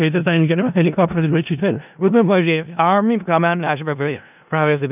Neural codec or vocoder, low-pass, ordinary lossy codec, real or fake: codec, 16 kHz in and 24 kHz out, 0.4 kbps, LongCat-Audio-Codec, four codebook decoder; 3.6 kHz; none; fake